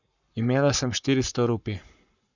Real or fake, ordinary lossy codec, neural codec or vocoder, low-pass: fake; none; codec, 44.1 kHz, 7.8 kbps, Pupu-Codec; 7.2 kHz